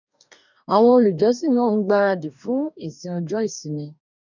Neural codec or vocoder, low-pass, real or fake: codec, 44.1 kHz, 2.6 kbps, DAC; 7.2 kHz; fake